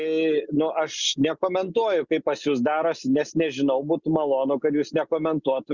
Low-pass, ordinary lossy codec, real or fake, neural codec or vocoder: 7.2 kHz; Opus, 64 kbps; real; none